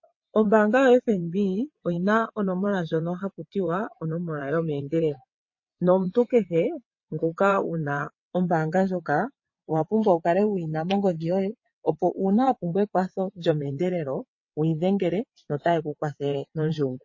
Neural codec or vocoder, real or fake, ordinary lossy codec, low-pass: vocoder, 22.05 kHz, 80 mel bands, WaveNeXt; fake; MP3, 32 kbps; 7.2 kHz